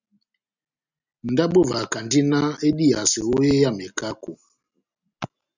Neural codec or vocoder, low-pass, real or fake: none; 7.2 kHz; real